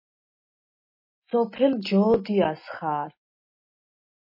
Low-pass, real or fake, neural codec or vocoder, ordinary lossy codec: 5.4 kHz; real; none; MP3, 24 kbps